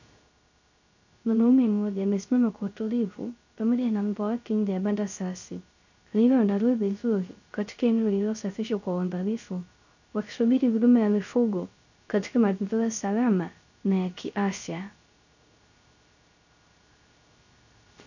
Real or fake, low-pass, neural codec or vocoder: fake; 7.2 kHz; codec, 16 kHz, 0.3 kbps, FocalCodec